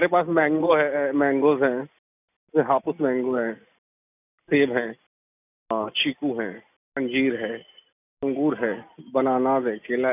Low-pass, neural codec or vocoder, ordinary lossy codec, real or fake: 3.6 kHz; none; none; real